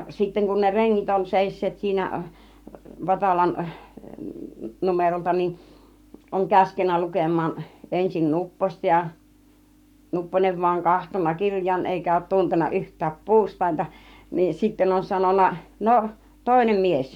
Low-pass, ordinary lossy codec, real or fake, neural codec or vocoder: 19.8 kHz; none; fake; codec, 44.1 kHz, 7.8 kbps, Pupu-Codec